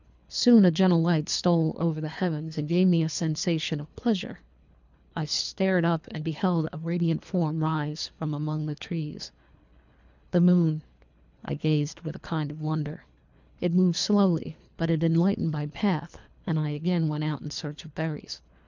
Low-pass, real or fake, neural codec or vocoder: 7.2 kHz; fake; codec, 24 kHz, 3 kbps, HILCodec